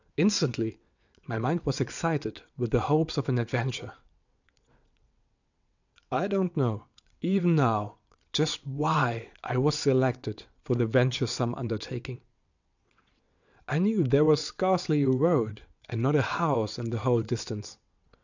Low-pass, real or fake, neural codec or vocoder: 7.2 kHz; fake; vocoder, 22.05 kHz, 80 mel bands, WaveNeXt